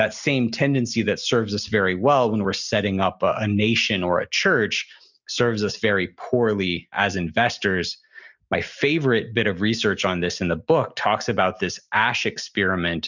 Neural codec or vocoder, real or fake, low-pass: none; real; 7.2 kHz